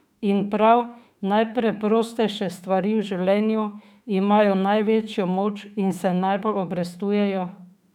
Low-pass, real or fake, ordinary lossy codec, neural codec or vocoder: 19.8 kHz; fake; none; autoencoder, 48 kHz, 32 numbers a frame, DAC-VAE, trained on Japanese speech